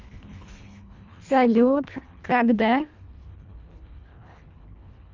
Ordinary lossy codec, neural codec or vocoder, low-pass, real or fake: Opus, 32 kbps; codec, 24 kHz, 1.5 kbps, HILCodec; 7.2 kHz; fake